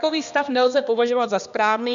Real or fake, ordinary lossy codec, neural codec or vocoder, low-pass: fake; MP3, 64 kbps; codec, 16 kHz, 2 kbps, X-Codec, HuBERT features, trained on balanced general audio; 7.2 kHz